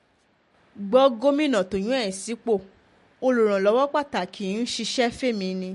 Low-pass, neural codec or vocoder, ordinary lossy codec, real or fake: 14.4 kHz; none; MP3, 48 kbps; real